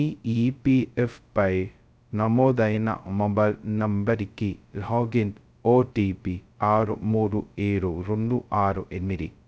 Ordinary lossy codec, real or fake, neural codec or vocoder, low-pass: none; fake; codec, 16 kHz, 0.2 kbps, FocalCodec; none